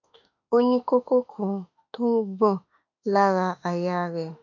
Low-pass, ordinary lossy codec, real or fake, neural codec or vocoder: 7.2 kHz; none; fake; autoencoder, 48 kHz, 32 numbers a frame, DAC-VAE, trained on Japanese speech